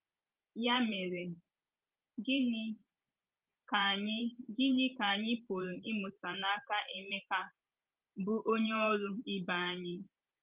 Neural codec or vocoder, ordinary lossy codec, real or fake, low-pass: vocoder, 44.1 kHz, 128 mel bands every 512 samples, BigVGAN v2; Opus, 24 kbps; fake; 3.6 kHz